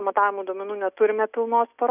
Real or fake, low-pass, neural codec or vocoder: real; 3.6 kHz; none